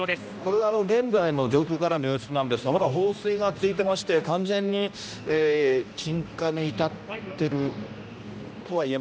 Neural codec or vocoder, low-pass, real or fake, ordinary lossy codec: codec, 16 kHz, 1 kbps, X-Codec, HuBERT features, trained on general audio; none; fake; none